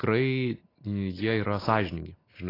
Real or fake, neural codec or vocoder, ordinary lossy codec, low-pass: real; none; AAC, 24 kbps; 5.4 kHz